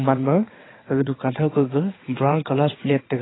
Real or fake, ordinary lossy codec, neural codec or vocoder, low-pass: fake; AAC, 16 kbps; vocoder, 22.05 kHz, 80 mel bands, WaveNeXt; 7.2 kHz